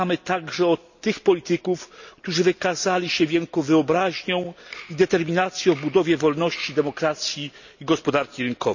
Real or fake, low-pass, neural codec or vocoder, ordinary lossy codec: real; 7.2 kHz; none; none